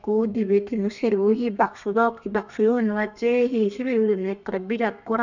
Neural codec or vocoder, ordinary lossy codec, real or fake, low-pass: codec, 32 kHz, 1.9 kbps, SNAC; none; fake; 7.2 kHz